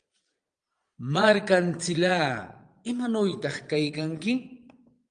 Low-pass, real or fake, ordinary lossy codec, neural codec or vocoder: 9.9 kHz; fake; Opus, 32 kbps; vocoder, 22.05 kHz, 80 mel bands, Vocos